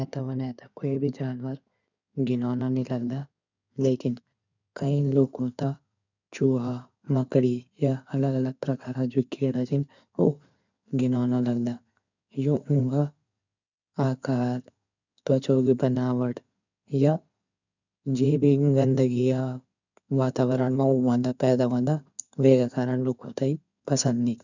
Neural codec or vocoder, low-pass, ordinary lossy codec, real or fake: codec, 16 kHz in and 24 kHz out, 2.2 kbps, FireRedTTS-2 codec; 7.2 kHz; none; fake